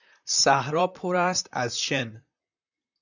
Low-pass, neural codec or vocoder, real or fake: 7.2 kHz; vocoder, 44.1 kHz, 128 mel bands, Pupu-Vocoder; fake